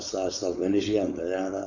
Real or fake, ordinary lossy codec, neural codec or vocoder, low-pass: fake; none; codec, 16 kHz, 4.8 kbps, FACodec; 7.2 kHz